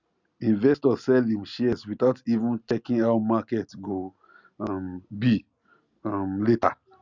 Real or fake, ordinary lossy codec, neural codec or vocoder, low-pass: real; Opus, 64 kbps; none; 7.2 kHz